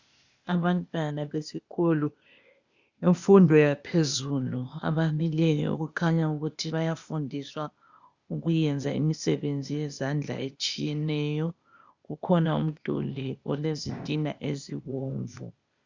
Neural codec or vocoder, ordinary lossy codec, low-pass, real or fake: codec, 16 kHz, 0.8 kbps, ZipCodec; Opus, 64 kbps; 7.2 kHz; fake